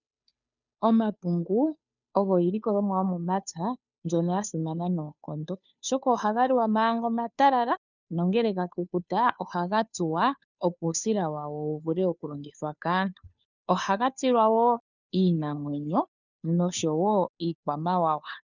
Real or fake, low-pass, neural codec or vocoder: fake; 7.2 kHz; codec, 16 kHz, 2 kbps, FunCodec, trained on Chinese and English, 25 frames a second